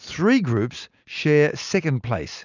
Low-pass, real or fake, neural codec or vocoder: 7.2 kHz; real; none